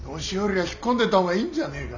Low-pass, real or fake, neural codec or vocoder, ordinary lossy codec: 7.2 kHz; real; none; none